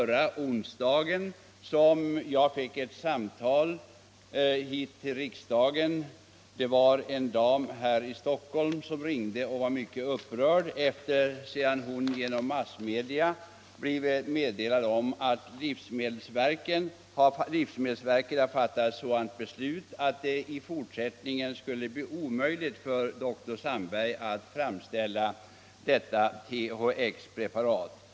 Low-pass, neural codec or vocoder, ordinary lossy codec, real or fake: none; none; none; real